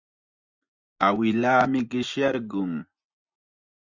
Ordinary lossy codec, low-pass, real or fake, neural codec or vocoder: Opus, 64 kbps; 7.2 kHz; fake; vocoder, 24 kHz, 100 mel bands, Vocos